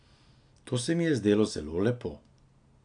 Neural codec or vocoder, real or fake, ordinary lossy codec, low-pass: none; real; AAC, 64 kbps; 9.9 kHz